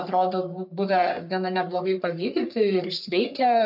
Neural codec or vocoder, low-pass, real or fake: codec, 44.1 kHz, 3.4 kbps, Pupu-Codec; 5.4 kHz; fake